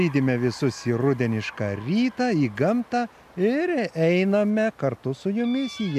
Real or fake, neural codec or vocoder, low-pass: real; none; 14.4 kHz